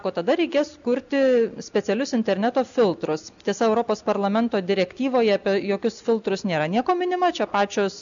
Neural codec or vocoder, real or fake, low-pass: none; real; 7.2 kHz